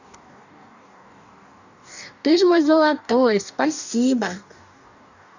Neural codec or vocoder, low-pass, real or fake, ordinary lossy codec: codec, 44.1 kHz, 2.6 kbps, DAC; 7.2 kHz; fake; none